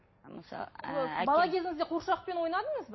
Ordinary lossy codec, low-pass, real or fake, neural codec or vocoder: MP3, 24 kbps; 7.2 kHz; real; none